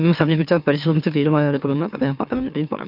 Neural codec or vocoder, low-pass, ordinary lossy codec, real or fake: autoencoder, 44.1 kHz, a latent of 192 numbers a frame, MeloTTS; 5.4 kHz; none; fake